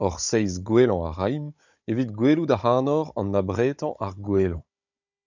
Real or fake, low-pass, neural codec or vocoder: fake; 7.2 kHz; codec, 16 kHz, 16 kbps, FunCodec, trained on Chinese and English, 50 frames a second